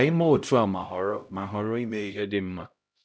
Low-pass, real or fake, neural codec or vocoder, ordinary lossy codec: none; fake; codec, 16 kHz, 0.5 kbps, X-Codec, HuBERT features, trained on LibriSpeech; none